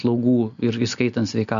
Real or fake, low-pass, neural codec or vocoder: real; 7.2 kHz; none